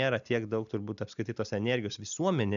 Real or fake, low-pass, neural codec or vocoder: real; 7.2 kHz; none